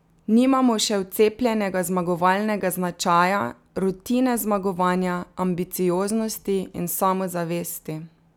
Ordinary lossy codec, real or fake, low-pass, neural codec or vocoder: none; real; 19.8 kHz; none